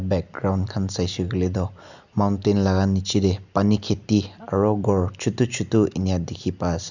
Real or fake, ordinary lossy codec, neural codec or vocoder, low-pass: real; none; none; 7.2 kHz